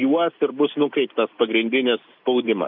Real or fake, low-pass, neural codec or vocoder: real; 5.4 kHz; none